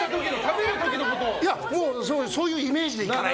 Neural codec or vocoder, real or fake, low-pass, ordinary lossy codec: none; real; none; none